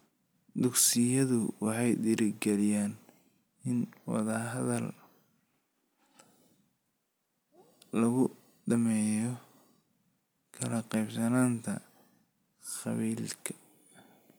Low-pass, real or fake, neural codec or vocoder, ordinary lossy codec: none; real; none; none